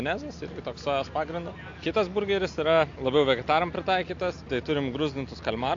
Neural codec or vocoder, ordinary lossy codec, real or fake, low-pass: none; AAC, 48 kbps; real; 7.2 kHz